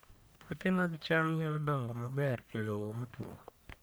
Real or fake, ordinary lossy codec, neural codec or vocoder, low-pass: fake; none; codec, 44.1 kHz, 1.7 kbps, Pupu-Codec; none